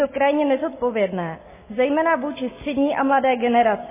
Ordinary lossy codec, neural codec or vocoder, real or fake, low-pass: MP3, 16 kbps; none; real; 3.6 kHz